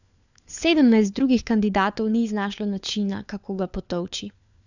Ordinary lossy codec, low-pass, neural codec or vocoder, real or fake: none; 7.2 kHz; codec, 16 kHz, 4 kbps, FunCodec, trained on LibriTTS, 50 frames a second; fake